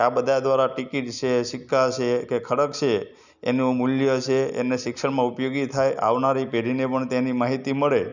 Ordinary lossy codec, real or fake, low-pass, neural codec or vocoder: none; real; 7.2 kHz; none